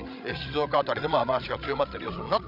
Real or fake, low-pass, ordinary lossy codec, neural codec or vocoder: fake; 5.4 kHz; none; codec, 16 kHz, 16 kbps, FreqCodec, larger model